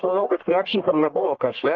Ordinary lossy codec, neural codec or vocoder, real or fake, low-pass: Opus, 32 kbps; codec, 44.1 kHz, 1.7 kbps, Pupu-Codec; fake; 7.2 kHz